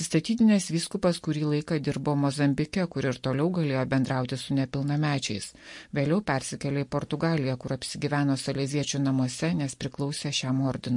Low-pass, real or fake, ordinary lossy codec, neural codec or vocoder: 10.8 kHz; real; MP3, 48 kbps; none